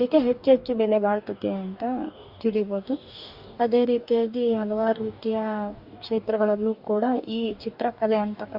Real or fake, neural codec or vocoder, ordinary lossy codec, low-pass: fake; codec, 44.1 kHz, 2.6 kbps, DAC; Opus, 64 kbps; 5.4 kHz